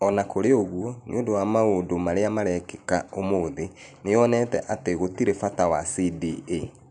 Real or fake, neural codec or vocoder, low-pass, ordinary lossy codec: real; none; 9.9 kHz; none